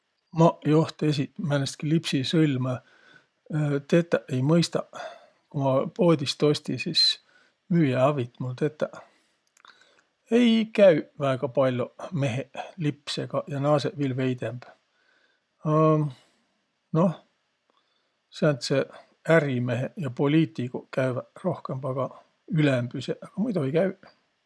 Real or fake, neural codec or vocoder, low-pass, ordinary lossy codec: real; none; none; none